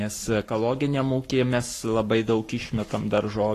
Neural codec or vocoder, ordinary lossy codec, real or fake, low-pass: codec, 44.1 kHz, 7.8 kbps, Pupu-Codec; AAC, 48 kbps; fake; 14.4 kHz